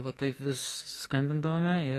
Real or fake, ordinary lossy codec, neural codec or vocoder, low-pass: fake; AAC, 48 kbps; codec, 44.1 kHz, 2.6 kbps, SNAC; 14.4 kHz